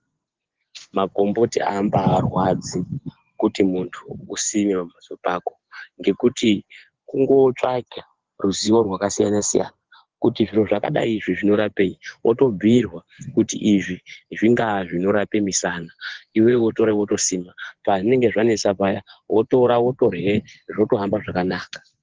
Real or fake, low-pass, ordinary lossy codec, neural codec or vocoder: fake; 7.2 kHz; Opus, 16 kbps; vocoder, 24 kHz, 100 mel bands, Vocos